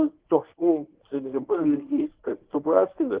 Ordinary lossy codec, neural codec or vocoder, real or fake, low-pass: Opus, 16 kbps; codec, 24 kHz, 0.9 kbps, WavTokenizer, medium speech release version 1; fake; 3.6 kHz